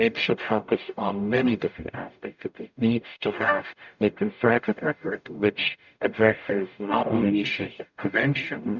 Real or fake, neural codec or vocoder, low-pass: fake; codec, 44.1 kHz, 0.9 kbps, DAC; 7.2 kHz